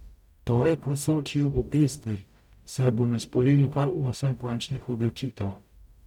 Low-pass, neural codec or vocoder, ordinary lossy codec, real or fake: 19.8 kHz; codec, 44.1 kHz, 0.9 kbps, DAC; none; fake